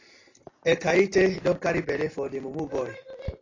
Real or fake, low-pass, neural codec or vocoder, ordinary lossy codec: real; 7.2 kHz; none; AAC, 32 kbps